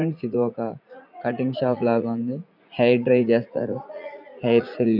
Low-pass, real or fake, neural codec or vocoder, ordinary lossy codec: 5.4 kHz; fake; vocoder, 44.1 kHz, 80 mel bands, Vocos; none